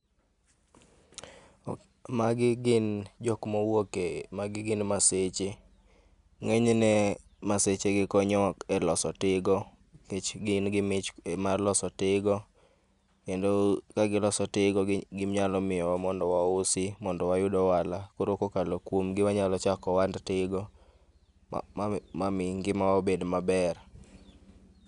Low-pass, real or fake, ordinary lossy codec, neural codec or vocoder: 10.8 kHz; real; none; none